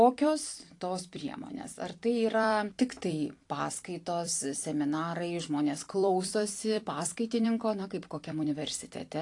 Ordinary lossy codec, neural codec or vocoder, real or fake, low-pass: AAC, 48 kbps; vocoder, 44.1 kHz, 128 mel bands every 256 samples, BigVGAN v2; fake; 10.8 kHz